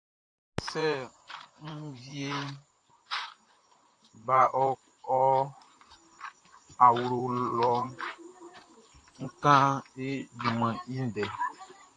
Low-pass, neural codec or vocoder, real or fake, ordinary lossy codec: 9.9 kHz; vocoder, 44.1 kHz, 128 mel bands, Pupu-Vocoder; fake; AAC, 48 kbps